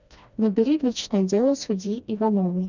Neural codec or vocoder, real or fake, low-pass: codec, 16 kHz, 1 kbps, FreqCodec, smaller model; fake; 7.2 kHz